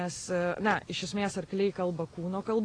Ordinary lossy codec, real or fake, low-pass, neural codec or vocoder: AAC, 32 kbps; real; 9.9 kHz; none